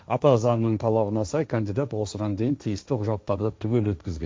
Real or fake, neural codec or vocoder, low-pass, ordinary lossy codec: fake; codec, 16 kHz, 1.1 kbps, Voila-Tokenizer; none; none